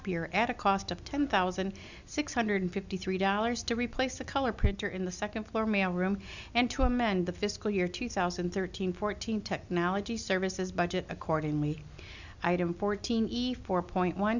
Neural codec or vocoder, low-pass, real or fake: none; 7.2 kHz; real